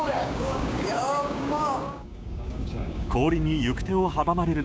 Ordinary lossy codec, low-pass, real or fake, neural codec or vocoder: none; none; fake; codec, 16 kHz, 6 kbps, DAC